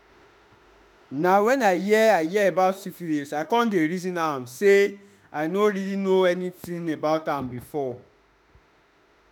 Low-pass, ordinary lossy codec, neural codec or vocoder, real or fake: none; none; autoencoder, 48 kHz, 32 numbers a frame, DAC-VAE, trained on Japanese speech; fake